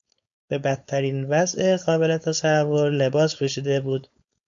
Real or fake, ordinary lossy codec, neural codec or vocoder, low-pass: fake; AAC, 64 kbps; codec, 16 kHz, 4.8 kbps, FACodec; 7.2 kHz